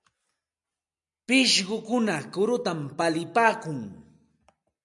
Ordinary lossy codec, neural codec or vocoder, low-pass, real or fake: MP3, 96 kbps; none; 10.8 kHz; real